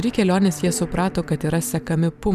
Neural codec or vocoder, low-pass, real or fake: vocoder, 44.1 kHz, 128 mel bands every 512 samples, BigVGAN v2; 14.4 kHz; fake